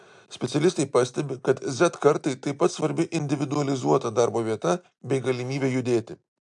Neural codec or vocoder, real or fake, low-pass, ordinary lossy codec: none; real; 10.8 kHz; MP3, 64 kbps